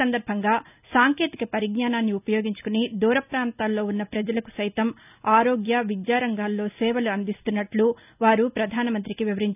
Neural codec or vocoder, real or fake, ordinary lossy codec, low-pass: none; real; none; 3.6 kHz